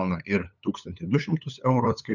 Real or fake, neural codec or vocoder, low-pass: fake; codec, 16 kHz, 8 kbps, FunCodec, trained on LibriTTS, 25 frames a second; 7.2 kHz